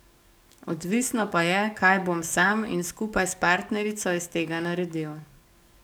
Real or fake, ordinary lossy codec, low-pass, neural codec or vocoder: fake; none; none; codec, 44.1 kHz, 7.8 kbps, DAC